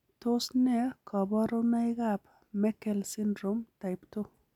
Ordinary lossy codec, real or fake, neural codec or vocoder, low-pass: none; real; none; 19.8 kHz